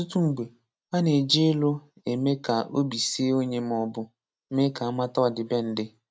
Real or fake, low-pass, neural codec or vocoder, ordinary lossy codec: real; none; none; none